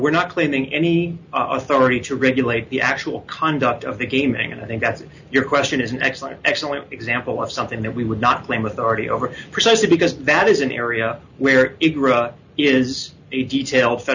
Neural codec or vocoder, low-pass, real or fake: none; 7.2 kHz; real